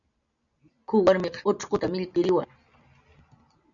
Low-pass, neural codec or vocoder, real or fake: 7.2 kHz; none; real